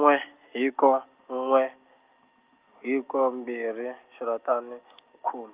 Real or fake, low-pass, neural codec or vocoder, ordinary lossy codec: real; 3.6 kHz; none; Opus, 24 kbps